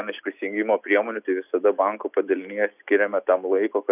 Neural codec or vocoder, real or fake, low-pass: none; real; 3.6 kHz